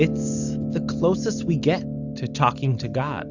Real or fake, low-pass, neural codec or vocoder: real; 7.2 kHz; none